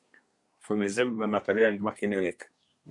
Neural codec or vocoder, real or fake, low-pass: codec, 24 kHz, 1 kbps, SNAC; fake; 10.8 kHz